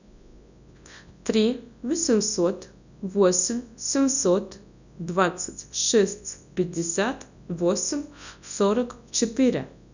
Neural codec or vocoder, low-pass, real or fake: codec, 24 kHz, 0.9 kbps, WavTokenizer, large speech release; 7.2 kHz; fake